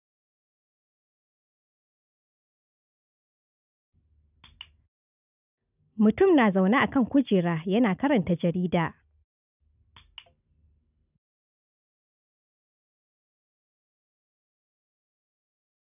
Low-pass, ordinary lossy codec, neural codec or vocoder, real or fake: 3.6 kHz; none; none; real